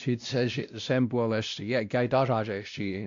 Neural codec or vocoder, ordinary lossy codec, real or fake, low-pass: codec, 16 kHz, 1 kbps, X-Codec, WavLM features, trained on Multilingual LibriSpeech; AAC, 64 kbps; fake; 7.2 kHz